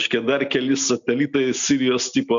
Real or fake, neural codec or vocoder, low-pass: real; none; 7.2 kHz